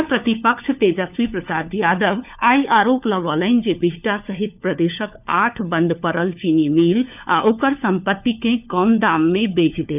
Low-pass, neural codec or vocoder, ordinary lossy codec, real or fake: 3.6 kHz; codec, 16 kHz, 4 kbps, FunCodec, trained on LibriTTS, 50 frames a second; none; fake